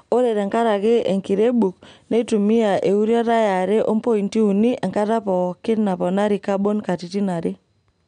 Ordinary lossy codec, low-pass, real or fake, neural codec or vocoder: none; 9.9 kHz; real; none